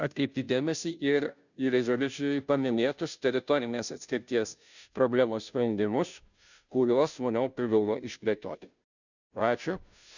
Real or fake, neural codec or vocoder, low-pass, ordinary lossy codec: fake; codec, 16 kHz, 0.5 kbps, FunCodec, trained on Chinese and English, 25 frames a second; 7.2 kHz; none